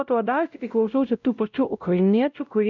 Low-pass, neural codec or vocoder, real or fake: 7.2 kHz; codec, 16 kHz, 0.5 kbps, X-Codec, WavLM features, trained on Multilingual LibriSpeech; fake